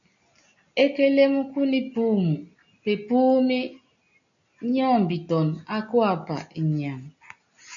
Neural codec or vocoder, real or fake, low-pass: none; real; 7.2 kHz